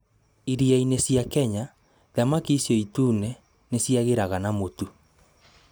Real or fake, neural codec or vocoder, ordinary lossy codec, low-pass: real; none; none; none